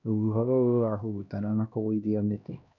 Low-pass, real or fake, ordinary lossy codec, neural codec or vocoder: 7.2 kHz; fake; none; codec, 16 kHz, 1 kbps, X-Codec, HuBERT features, trained on LibriSpeech